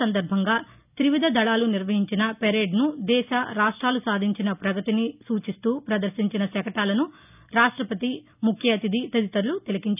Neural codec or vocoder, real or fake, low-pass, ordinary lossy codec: none; real; 3.6 kHz; none